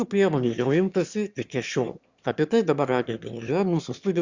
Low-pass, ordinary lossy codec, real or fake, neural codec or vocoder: 7.2 kHz; Opus, 64 kbps; fake; autoencoder, 22.05 kHz, a latent of 192 numbers a frame, VITS, trained on one speaker